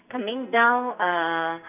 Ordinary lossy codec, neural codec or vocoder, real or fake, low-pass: none; codec, 44.1 kHz, 2.6 kbps, SNAC; fake; 3.6 kHz